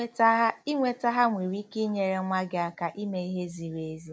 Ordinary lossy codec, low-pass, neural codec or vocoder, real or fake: none; none; none; real